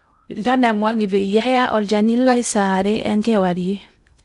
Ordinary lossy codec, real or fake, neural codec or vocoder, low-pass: none; fake; codec, 16 kHz in and 24 kHz out, 0.6 kbps, FocalCodec, streaming, 2048 codes; 10.8 kHz